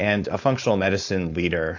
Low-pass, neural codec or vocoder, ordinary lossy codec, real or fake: 7.2 kHz; codec, 16 kHz, 4.8 kbps, FACodec; AAC, 48 kbps; fake